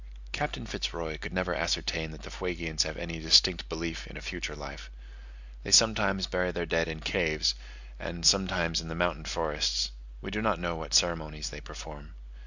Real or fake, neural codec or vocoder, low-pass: real; none; 7.2 kHz